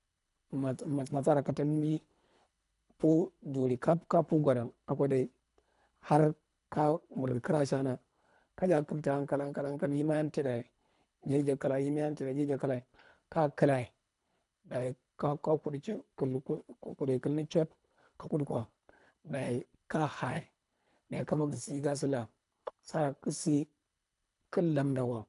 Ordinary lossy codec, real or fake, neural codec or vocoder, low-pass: none; fake; codec, 24 kHz, 3 kbps, HILCodec; 10.8 kHz